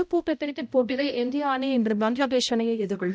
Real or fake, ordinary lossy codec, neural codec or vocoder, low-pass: fake; none; codec, 16 kHz, 0.5 kbps, X-Codec, HuBERT features, trained on balanced general audio; none